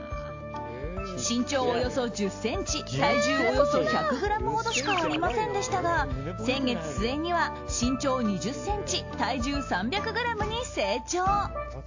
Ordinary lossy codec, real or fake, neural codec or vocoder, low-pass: AAC, 48 kbps; real; none; 7.2 kHz